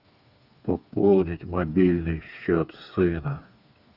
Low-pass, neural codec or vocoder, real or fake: 5.4 kHz; codec, 16 kHz, 4 kbps, FreqCodec, smaller model; fake